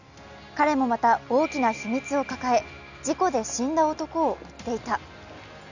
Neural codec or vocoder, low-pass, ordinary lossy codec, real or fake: none; 7.2 kHz; none; real